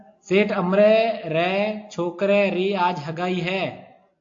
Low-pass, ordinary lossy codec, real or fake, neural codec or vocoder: 7.2 kHz; AAC, 48 kbps; real; none